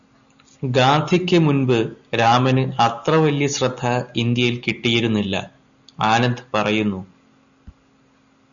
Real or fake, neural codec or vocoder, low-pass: real; none; 7.2 kHz